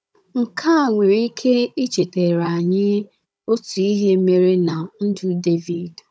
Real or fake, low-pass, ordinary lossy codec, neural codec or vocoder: fake; none; none; codec, 16 kHz, 16 kbps, FunCodec, trained on Chinese and English, 50 frames a second